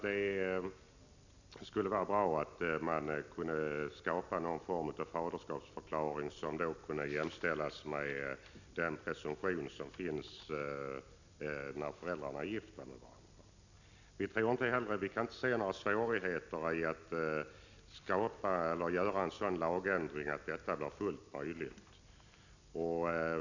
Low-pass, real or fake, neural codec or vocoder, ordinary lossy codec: 7.2 kHz; real; none; none